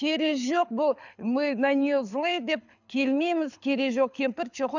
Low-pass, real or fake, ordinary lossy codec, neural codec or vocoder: 7.2 kHz; fake; none; codec, 24 kHz, 6 kbps, HILCodec